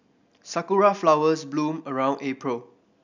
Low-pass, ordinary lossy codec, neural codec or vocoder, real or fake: 7.2 kHz; none; none; real